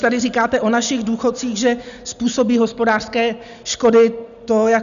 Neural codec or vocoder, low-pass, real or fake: none; 7.2 kHz; real